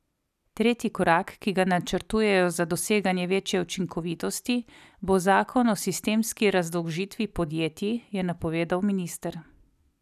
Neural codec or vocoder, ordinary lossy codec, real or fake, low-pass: none; none; real; 14.4 kHz